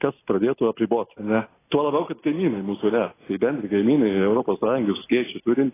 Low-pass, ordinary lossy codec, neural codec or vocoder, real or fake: 3.6 kHz; AAC, 16 kbps; none; real